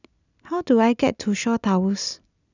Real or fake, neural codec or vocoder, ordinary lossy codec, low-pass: real; none; none; 7.2 kHz